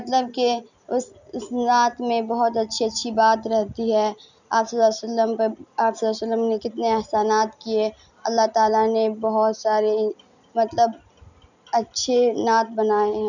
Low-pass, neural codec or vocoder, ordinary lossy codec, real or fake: 7.2 kHz; none; none; real